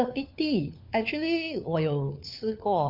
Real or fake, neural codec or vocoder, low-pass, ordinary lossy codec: fake; codec, 16 kHz, 4 kbps, FunCodec, trained on LibriTTS, 50 frames a second; 5.4 kHz; none